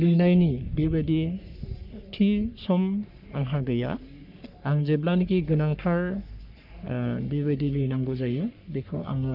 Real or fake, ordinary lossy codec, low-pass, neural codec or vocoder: fake; none; 5.4 kHz; codec, 44.1 kHz, 3.4 kbps, Pupu-Codec